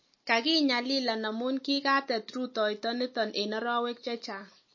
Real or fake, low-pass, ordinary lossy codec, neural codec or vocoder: real; 7.2 kHz; MP3, 32 kbps; none